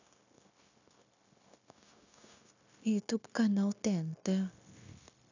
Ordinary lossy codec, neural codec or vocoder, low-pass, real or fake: none; codec, 16 kHz, 0.9 kbps, LongCat-Audio-Codec; 7.2 kHz; fake